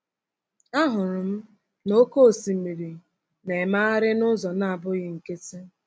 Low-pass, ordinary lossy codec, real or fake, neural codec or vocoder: none; none; real; none